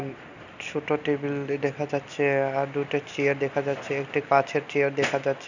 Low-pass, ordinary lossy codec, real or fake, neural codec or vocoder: 7.2 kHz; none; real; none